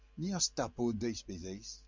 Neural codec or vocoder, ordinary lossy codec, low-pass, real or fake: none; MP3, 64 kbps; 7.2 kHz; real